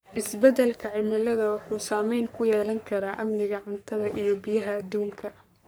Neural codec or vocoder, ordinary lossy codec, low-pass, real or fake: codec, 44.1 kHz, 3.4 kbps, Pupu-Codec; none; none; fake